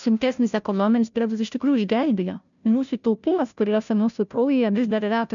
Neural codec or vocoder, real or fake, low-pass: codec, 16 kHz, 0.5 kbps, FunCodec, trained on Chinese and English, 25 frames a second; fake; 7.2 kHz